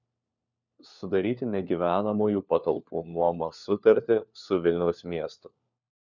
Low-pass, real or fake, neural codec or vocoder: 7.2 kHz; fake; codec, 16 kHz, 4 kbps, FunCodec, trained on LibriTTS, 50 frames a second